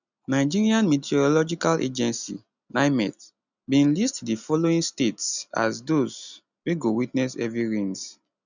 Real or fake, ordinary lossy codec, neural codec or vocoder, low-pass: real; none; none; 7.2 kHz